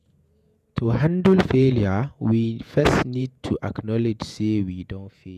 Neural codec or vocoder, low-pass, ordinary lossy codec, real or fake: vocoder, 48 kHz, 128 mel bands, Vocos; 14.4 kHz; none; fake